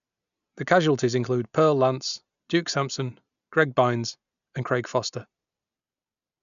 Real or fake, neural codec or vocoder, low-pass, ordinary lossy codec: real; none; 7.2 kHz; none